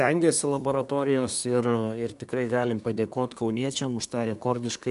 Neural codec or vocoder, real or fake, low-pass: codec, 24 kHz, 1 kbps, SNAC; fake; 10.8 kHz